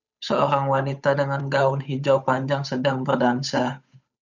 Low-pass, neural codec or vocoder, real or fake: 7.2 kHz; codec, 16 kHz, 8 kbps, FunCodec, trained on Chinese and English, 25 frames a second; fake